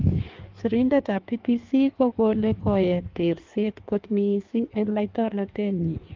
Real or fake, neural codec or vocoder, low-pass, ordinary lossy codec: fake; codec, 16 kHz in and 24 kHz out, 1.1 kbps, FireRedTTS-2 codec; 7.2 kHz; Opus, 32 kbps